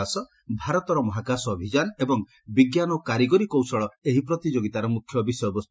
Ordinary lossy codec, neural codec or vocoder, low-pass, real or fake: none; none; none; real